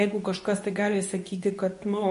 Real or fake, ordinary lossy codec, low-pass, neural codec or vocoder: fake; MP3, 48 kbps; 10.8 kHz; codec, 24 kHz, 0.9 kbps, WavTokenizer, medium speech release version 2